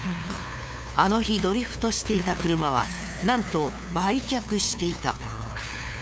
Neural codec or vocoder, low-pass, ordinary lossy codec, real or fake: codec, 16 kHz, 2 kbps, FunCodec, trained on LibriTTS, 25 frames a second; none; none; fake